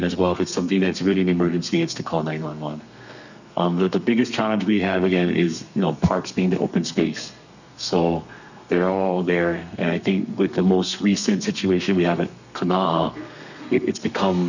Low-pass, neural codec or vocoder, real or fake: 7.2 kHz; codec, 32 kHz, 1.9 kbps, SNAC; fake